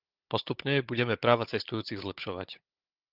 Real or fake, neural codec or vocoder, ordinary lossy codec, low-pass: fake; codec, 16 kHz, 16 kbps, FunCodec, trained on Chinese and English, 50 frames a second; Opus, 24 kbps; 5.4 kHz